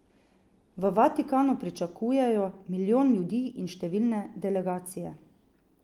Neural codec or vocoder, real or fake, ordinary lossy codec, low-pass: none; real; Opus, 24 kbps; 19.8 kHz